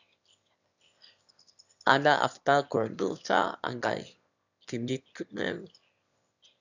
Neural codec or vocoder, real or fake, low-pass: autoencoder, 22.05 kHz, a latent of 192 numbers a frame, VITS, trained on one speaker; fake; 7.2 kHz